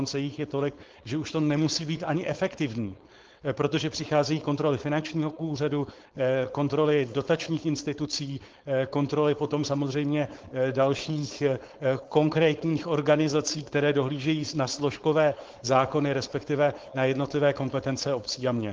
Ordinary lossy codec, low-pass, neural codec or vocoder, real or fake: Opus, 16 kbps; 7.2 kHz; codec, 16 kHz, 4.8 kbps, FACodec; fake